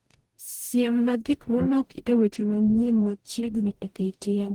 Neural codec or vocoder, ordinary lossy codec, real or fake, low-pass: codec, 44.1 kHz, 0.9 kbps, DAC; Opus, 24 kbps; fake; 19.8 kHz